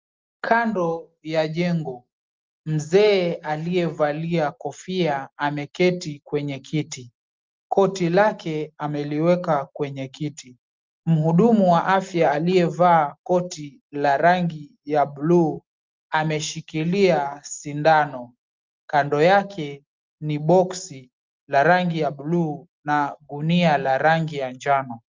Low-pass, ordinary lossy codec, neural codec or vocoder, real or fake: 7.2 kHz; Opus, 32 kbps; none; real